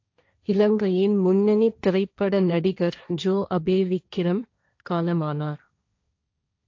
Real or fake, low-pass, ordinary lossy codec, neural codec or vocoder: fake; 7.2 kHz; AAC, 48 kbps; codec, 16 kHz, 1.1 kbps, Voila-Tokenizer